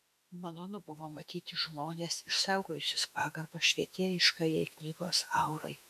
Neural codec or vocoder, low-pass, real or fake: autoencoder, 48 kHz, 32 numbers a frame, DAC-VAE, trained on Japanese speech; 14.4 kHz; fake